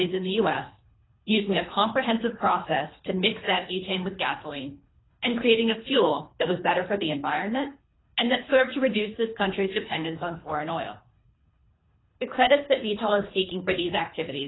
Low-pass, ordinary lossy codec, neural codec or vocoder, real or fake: 7.2 kHz; AAC, 16 kbps; codec, 24 kHz, 3 kbps, HILCodec; fake